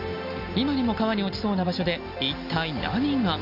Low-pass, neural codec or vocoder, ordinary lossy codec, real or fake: 5.4 kHz; none; none; real